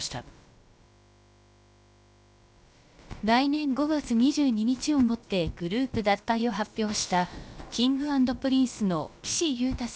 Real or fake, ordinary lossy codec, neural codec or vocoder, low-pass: fake; none; codec, 16 kHz, about 1 kbps, DyCAST, with the encoder's durations; none